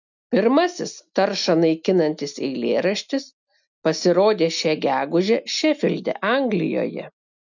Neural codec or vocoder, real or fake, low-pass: none; real; 7.2 kHz